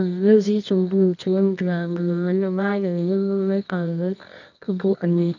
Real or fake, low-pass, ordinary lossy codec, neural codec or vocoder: fake; 7.2 kHz; none; codec, 24 kHz, 0.9 kbps, WavTokenizer, medium music audio release